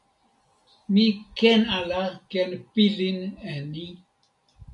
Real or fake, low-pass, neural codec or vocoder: real; 10.8 kHz; none